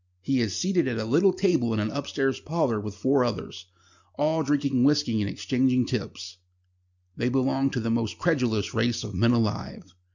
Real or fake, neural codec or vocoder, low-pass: real; none; 7.2 kHz